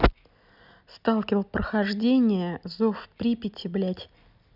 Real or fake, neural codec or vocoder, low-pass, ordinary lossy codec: real; none; 5.4 kHz; none